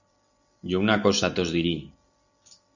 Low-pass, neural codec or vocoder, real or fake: 7.2 kHz; none; real